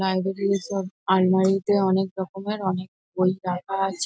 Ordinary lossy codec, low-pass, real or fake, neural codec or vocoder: none; none; real; none